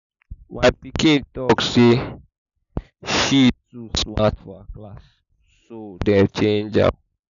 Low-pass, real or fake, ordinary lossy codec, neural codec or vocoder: 7.2 kHz; real; none; none